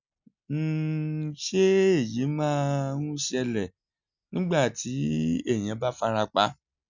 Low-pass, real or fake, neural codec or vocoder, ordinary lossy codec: 7.2 kHz; real; none; none